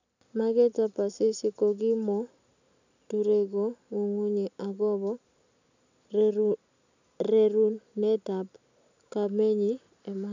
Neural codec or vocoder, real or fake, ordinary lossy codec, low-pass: none; real; none; 7.2 kHz